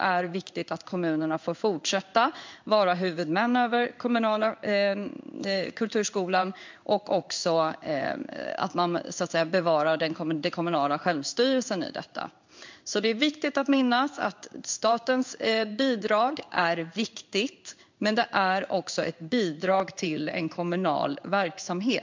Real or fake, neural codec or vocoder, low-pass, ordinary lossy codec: fake; codec, 16 kHz in and 24 kHz out, 1 kbps, XY-Tokenizer; 7.2 kHz; MP3, 64 kbps